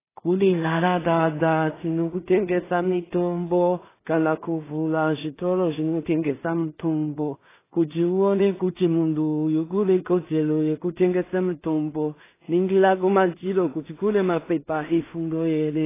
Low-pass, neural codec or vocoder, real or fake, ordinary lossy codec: 3.6 kHz; codec, 16 kHz in and 24 kHz out, 0.4 kbps, LongCat-Audio-Codec, two codebook decoder; fake; AAC, 16 kbps